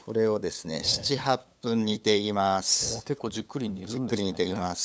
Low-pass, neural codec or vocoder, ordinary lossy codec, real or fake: none; codec, 16 kHz, 8 kbps, FunCodec, trained on LibriTTS, 25 frames a second; none; fake